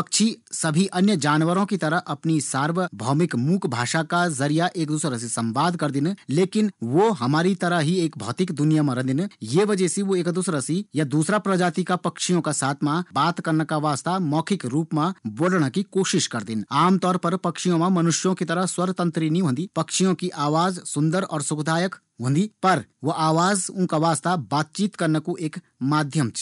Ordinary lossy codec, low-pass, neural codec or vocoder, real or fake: none; 10.8 kHz; none; real